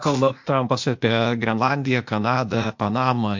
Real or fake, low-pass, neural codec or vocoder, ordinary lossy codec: fake; 7.2 kHz; codec, 16 kHz, 0.8 kbps, ZipCodec; MP3, 48 kbps